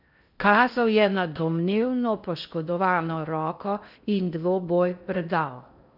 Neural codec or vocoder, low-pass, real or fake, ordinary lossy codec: codec, 16 kHz in and 24 kHz out, 0.6 kbps, FocalCodec, streaming, 4096 codes; 5.4 kHz; fake; none